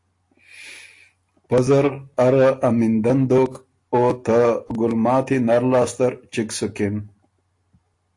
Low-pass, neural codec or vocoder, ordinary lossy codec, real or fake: 10.8 kHz; none; AAC, 48 kbps; real